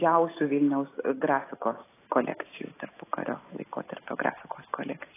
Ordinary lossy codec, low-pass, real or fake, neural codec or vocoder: AAC, 16 kbps; 3.6 kHz; real; none